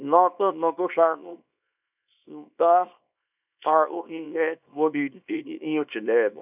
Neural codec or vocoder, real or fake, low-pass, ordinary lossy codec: codec, 24 kHz, 0.9 kbps, WavTokenizer, small release; fake; 3.6 kHz; none